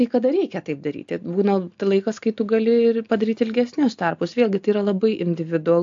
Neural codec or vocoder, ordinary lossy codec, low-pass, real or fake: none; AAC, 64 kbps; 7.2 kHz; real